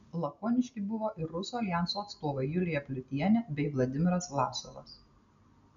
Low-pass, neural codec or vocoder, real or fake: 7.2 kHz; none; real